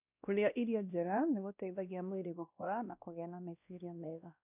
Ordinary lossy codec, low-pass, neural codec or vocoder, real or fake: none; 3.6 kHz; codec, 16 kHz, 1 kbps, X-Codec, WavLM features, trained on Multilingual LibriSpeech; fake